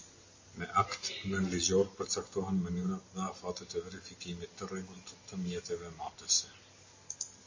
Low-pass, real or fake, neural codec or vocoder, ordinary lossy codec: 7.2 kHz; real; none; MP3, 32 kbps